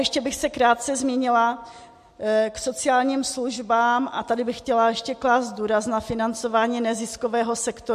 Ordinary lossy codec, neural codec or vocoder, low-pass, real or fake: MP3, 64 kbps; none; 14.4 kHz; real